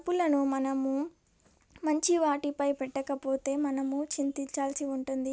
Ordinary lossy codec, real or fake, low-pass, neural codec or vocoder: none; real; none; none